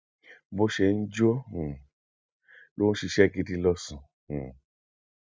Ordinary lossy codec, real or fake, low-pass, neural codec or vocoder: none; real; none; none